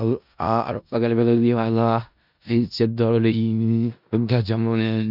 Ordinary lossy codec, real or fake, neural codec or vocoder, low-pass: none; fake; codec, 16 kHz in and 24 kHz out, 0.4 kbps, LongCat-Audio-Codec, four codebook decoder; 5.4 kHz